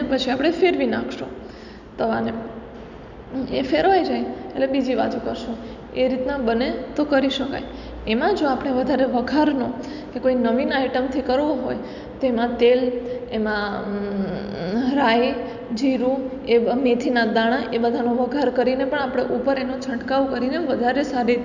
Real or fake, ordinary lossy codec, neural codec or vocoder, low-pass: real; none; none; 7.2 kHz